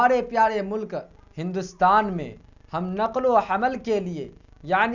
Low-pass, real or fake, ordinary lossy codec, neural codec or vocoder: 7.2 kHz; real; none; none